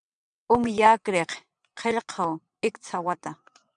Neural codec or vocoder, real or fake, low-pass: vocoder, 22.05 kHz, 80 mel bands, WaveNeXt; fake; 9.9 kHz